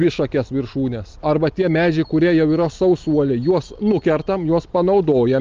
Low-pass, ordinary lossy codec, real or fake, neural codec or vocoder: 7.2 kHz; Opus, 24 kbps; real; none